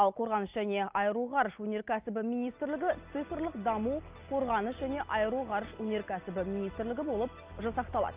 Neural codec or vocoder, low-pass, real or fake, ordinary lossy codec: none; 3.6 kHz; real; Opus, 24 kbps